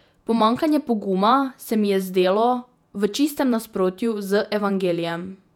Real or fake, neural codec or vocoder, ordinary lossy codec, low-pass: fake; vocoder, 48 kHz, 128 mel bands, Vocos; none; 19.8 kHz